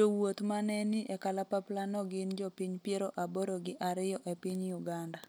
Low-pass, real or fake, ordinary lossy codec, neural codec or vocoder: none; real; none; none